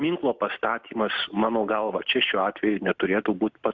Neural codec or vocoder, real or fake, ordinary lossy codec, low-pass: none; real; Opus, 64 kbps; 7.2 kHz